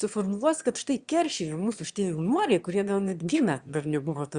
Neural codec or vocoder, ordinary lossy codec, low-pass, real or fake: autoencoder, 22.05 kHz, a latent of 192 numbers a frame, VITS, trained on one speaker; Opus, 64 kbps; 9.9 kHz; fake